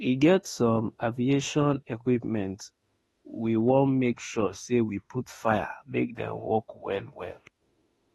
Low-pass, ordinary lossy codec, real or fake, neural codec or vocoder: 19.8 kHz; AAC, 32 kbps; fake; autoencoder, 48 kHz, 32 numbers a frame, DAC-VAE, trained on Japanese speech